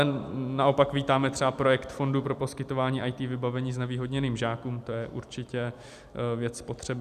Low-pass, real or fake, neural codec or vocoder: 14.4 kHz; real; none